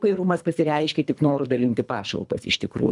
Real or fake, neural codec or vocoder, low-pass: fake; codec, 24 kHz, 3 kbps, HILCodec; 10.8 kHz